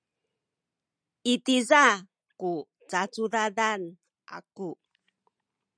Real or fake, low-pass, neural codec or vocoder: real; 9.9 kHz; none